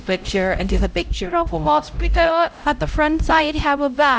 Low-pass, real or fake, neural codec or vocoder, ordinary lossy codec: none; fake; codec, 16 kHz, 0.5 kbps, X-Codec, HuBERT features, trained on LibriSpeech; none